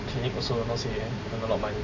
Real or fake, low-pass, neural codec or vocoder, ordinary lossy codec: real; 7.2 kHz; none; MP3, 64 kbps